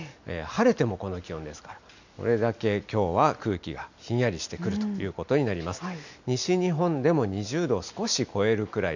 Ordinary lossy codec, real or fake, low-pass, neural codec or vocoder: none; real; 7.2 kHz; none